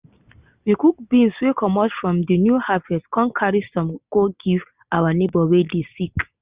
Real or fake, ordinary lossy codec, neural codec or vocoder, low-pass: real; Opus, 64 kbps; none; 3.6 kHz